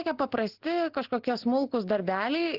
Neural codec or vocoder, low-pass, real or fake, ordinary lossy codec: none; 5.4 kHz; real; Opus, 16 kbps